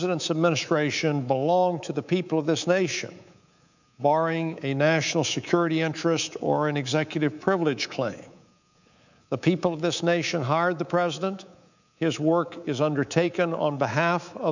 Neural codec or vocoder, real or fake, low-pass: codec, 24 kHz, 3.1 kbps, DualCodec; fake; 7.2 kHz